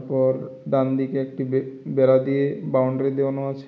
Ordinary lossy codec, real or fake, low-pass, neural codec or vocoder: none; real; none; none